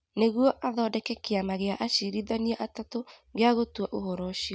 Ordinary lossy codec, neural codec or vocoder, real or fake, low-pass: none; none; real; none